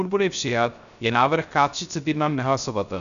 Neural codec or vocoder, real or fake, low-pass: codec, 16 kHz, 0.3 kbps, FocalCodec; fake; 7.2 kHz